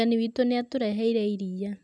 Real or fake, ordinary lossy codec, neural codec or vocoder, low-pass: real; none; none; none